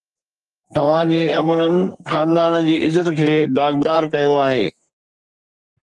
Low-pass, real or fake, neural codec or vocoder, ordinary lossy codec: 10.8 kHz; fake; codec, 32 kHz, 1.9 kbps, SNAC; Opus, 32 kbps